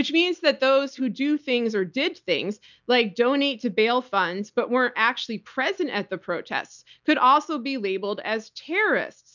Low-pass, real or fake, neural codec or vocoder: 7.2 kHz; real; none